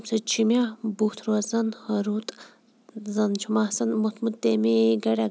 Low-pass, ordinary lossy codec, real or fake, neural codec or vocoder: none; none; real; none